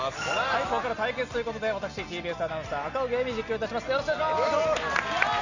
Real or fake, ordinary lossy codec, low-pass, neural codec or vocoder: real; Opus, 64 kbps; 7.2 kHz; none